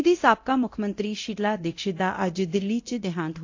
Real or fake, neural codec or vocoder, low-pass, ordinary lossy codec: fake; codec, 24 kHz, 0.9 kbps, DualCodec; 7.2 kHz; AAC, 48 kbps